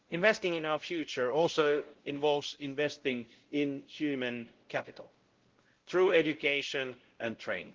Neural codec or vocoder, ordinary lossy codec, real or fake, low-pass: codec, 16 kHz, 0.5 kbps, X-Codec, WavLM features, trained on Multilingual LibriSpeech; Opus, 16 kbps; fake; 7.2 kHz